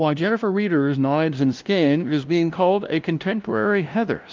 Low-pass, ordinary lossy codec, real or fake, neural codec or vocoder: 7.2 kHz; Opus, 32 kbps; fake; codec, 16 kHz, 0.5 kbps, FunCodec, trained on LibriTTS, 25 frames a second